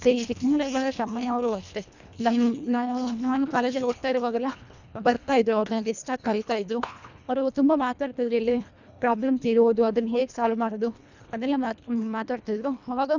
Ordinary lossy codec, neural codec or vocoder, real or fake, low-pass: none; codec, 24 kHz, 1.5 kbps, HILCodec; fake; 7.2 kHz